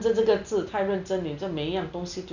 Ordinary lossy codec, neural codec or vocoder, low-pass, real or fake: none; none; 7.2 kHz; real